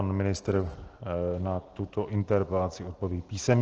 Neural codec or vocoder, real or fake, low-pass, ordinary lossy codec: none; real; 7.2 kHz; Opus, 16 kbps